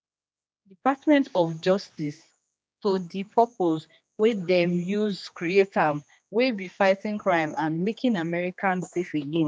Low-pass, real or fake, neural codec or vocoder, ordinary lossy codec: none; fake; codec, 16 kHz, 2 kbps, X-Codec, HuBERT features, trained on general audio; none